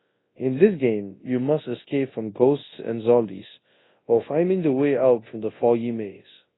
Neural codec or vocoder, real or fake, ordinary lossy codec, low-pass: codec, 24 kHz, 0.9 kbps, WavTokenizer, large speech release; fake; AAC, 16 kbps; 7.2 kHz